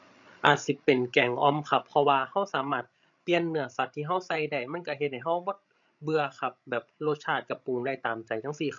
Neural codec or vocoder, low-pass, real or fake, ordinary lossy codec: codec, 16 kHz, 16 kbps, FreqCodec, larger model; 7.2 kHz; fake; MP3, 64 kbps